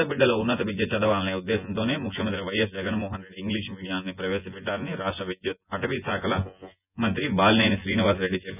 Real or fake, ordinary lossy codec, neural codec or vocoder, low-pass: fake; none; vocoder, 24 kHz, 100 mel bands, Vocos; 3.6 kHz